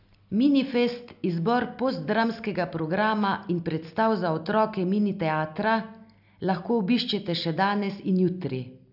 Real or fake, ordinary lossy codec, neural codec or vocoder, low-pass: real; none; none; 5.4 kHz